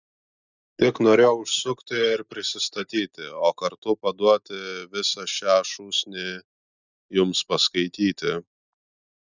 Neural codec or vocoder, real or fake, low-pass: none; real; 7.2 kHz